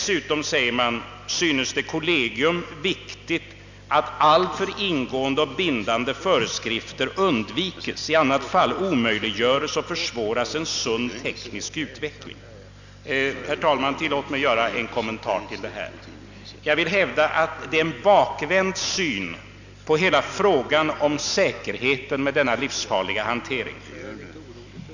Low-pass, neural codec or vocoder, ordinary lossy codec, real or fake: 7.2 kHz; none; none; real